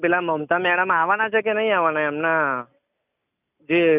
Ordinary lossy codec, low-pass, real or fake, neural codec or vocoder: none; 3.6 kHz; real; none